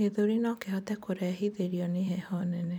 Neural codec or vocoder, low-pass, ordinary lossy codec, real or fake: vocoder, 44.1 kHz, 128 mel bands every 256 samples, BigVGAN v2; 19.8 kHz; none; fake